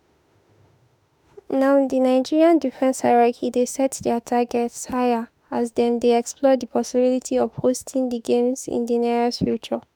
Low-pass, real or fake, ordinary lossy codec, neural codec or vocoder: none; fake; none; autoencoder, 48 kHz, 32 numbers a frame, DAC-VAE, trained on Japanese speech